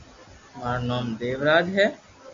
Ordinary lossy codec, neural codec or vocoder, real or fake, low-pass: MP3, 48 kbps; none; real; 7.2 kHz